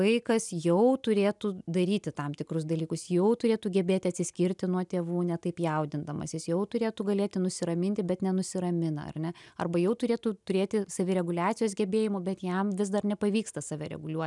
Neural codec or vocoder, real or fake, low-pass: none; real; 10.8 kHz